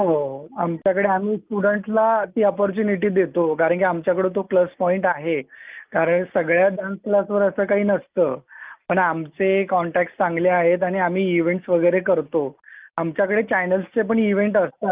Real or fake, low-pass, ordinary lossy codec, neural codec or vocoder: real; 3.6 kHz; Opus, 24 kbps; none